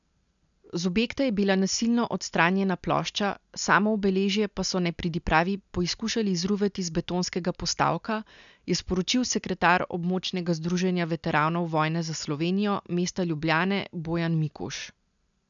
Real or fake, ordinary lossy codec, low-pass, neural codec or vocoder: real; none; 7.2 kHz; none